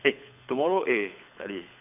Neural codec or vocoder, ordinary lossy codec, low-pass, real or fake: codec, 24 kHz, 6 kbps, HILCodec; none; 3.6 kHz; fake